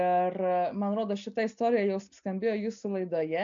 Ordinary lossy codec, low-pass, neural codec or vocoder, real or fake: AAC, 64 kbps; 7.2 kHz; none; real